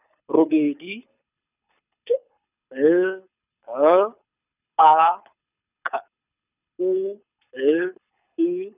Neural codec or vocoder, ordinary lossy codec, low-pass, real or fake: codec, 24 kHz, 6 kbps, HILCodec; none; 3.6 kHz; fake